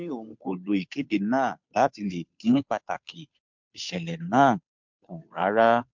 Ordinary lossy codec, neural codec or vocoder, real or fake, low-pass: MP3, 64 kbps; codec, 16 kHz, 2 kbps, FunCodec, trained on Chinese and English, 25 frames a second; fake; 7.2 kHz